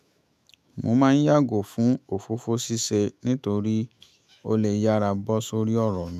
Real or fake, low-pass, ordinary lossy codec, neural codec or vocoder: fake; 14.4 kHz; none; autoencoder, 48 kHz, 128 numbers a frame, DAC-VAE, trained on Japanese speech